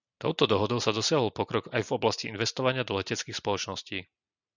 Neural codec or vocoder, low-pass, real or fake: none; 7.2 kHz; real